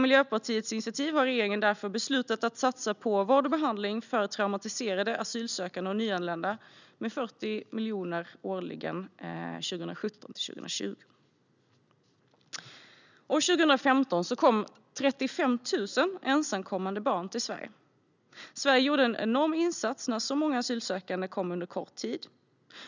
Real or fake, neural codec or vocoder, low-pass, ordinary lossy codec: real; none; 7.2 kHz; none